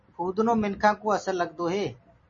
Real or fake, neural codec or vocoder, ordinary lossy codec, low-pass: real; none; MP3, 32 kbps; 7.2 kHz